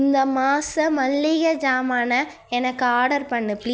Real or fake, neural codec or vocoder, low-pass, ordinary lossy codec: real; none; none; none